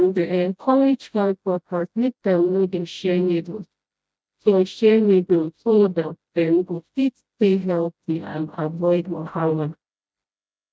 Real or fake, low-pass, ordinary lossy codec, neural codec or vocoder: fake; none; none; codec, 16 kHz, 0.5 kbps, FreqCodec, smaller model